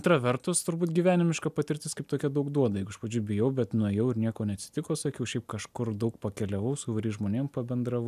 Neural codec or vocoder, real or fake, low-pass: none; real; 14.4 kHz